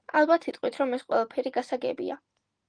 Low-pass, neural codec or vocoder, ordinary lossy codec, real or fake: 9.9 kHz; none; Opus, 24 kbps; real